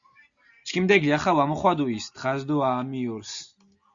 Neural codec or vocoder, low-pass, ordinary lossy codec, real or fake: none; 7.2 kHz; Opus, 64 kbps; real